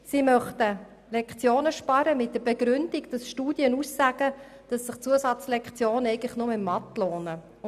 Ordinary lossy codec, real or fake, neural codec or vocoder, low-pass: none; real; none; 14.4 kHz